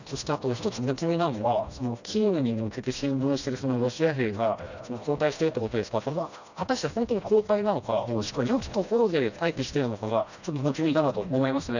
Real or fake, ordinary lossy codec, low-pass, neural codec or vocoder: fake; none; 7.2 kHz; codec, 16 kHz, 1 kbps, FreqCodec, smaller model